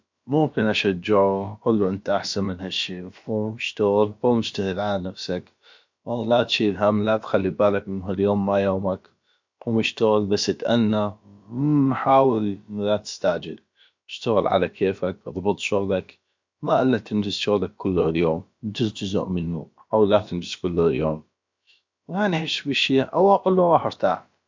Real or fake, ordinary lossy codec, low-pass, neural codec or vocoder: fake; MP3, 64 kbps; 7.2 kHz; codec, 16 kHz, about 1 kbps, DyCAST, with the encoder's durations